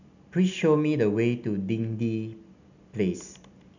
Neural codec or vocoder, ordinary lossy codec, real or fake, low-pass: none; none; real; 7.2 kHz